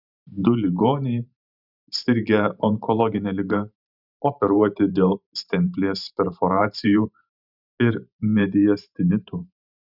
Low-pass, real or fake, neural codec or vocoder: 5.4 kHz; fake; vocoder, 44.1 kHz, 128 mel bands every 512 samples, BigVGAN v2